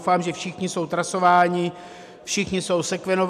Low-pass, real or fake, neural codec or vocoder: 14.4 kHz; real; none